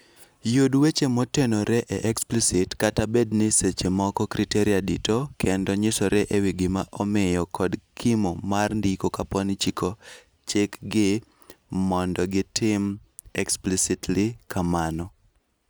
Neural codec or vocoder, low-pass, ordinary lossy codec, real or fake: none; none; none; real